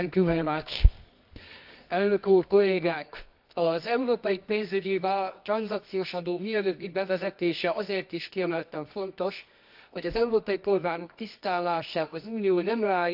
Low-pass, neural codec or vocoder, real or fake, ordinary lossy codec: 5.4 kHz; codec, 24 kHz, 0.9 kbps, WavTokenizer, medium music audio release; fake; AAC, 48 kbps